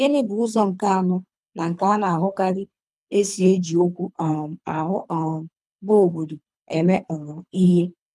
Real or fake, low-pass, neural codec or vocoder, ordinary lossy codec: fake; none; codec, 24 kHz, 3 kbps, HILCodec; none